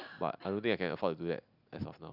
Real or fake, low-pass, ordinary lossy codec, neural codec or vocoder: real; 5.4 kHz; none; none